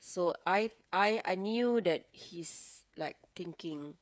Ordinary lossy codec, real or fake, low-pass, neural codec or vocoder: none; fake; none; codec, 16 kHz, 8 kbps, FreqCodec, smaller model